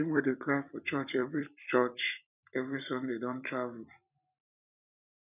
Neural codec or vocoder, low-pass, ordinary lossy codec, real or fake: vocoder, 44.1 kHz, 128 mel bands every 256 samples, BigVGAN v2; 3.6 kHz; none; fake